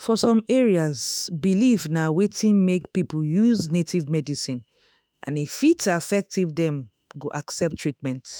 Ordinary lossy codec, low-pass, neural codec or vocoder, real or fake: none; none; autoencoder, 48 kHz, 32 numbers a frame, DAC-VAE, trained on Japanese speech; fake